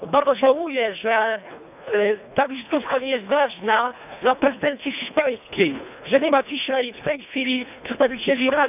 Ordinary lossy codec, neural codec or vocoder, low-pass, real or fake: none; codec, 24 kHz, 1.5 kbps, HILCodec; 3.6 kHz; fake